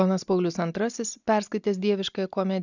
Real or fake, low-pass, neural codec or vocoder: real; 7.2 kHz; none